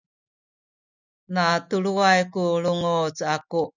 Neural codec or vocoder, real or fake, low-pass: none; real; 7.2 kHz